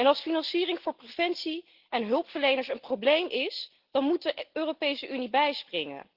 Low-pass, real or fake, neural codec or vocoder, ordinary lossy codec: 5.4 kHz; real; none; Opus, 16 kbps